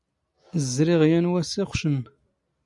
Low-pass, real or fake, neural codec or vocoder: 10.8 kHz; real; none